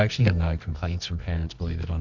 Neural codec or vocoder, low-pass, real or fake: codec, 24 kHz, 0.9 kbps, WavTokenizer, medium music audio release; 7.2 kHz; fake